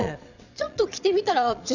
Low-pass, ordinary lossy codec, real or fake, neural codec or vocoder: 7.2 kHz; none; fake; vocoder, 22.05 kHz, 80 mel bands, Vocos